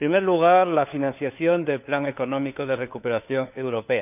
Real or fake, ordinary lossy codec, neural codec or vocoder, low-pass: fake; none; codec, 16 kHz, 4 kbps, FunCodec, trained on LibriTTS, 50 frames a second; 3.6 kHz